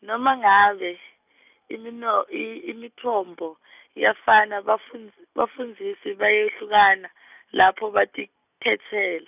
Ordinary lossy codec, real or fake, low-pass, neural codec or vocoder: none; real; 3.6 kHz; none